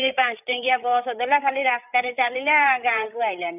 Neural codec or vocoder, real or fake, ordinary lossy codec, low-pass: codec, 16 kHz, 8 kbps, FreqCodec, larger model; fake; none; 3.6 kHz